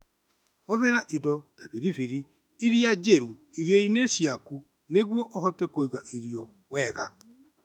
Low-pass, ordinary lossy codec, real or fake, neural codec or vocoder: 19.8 kHz; none; fake; autoencoder, 48 kHz, 32 numbers a frame, DAC-VAE, trained on Japanese speech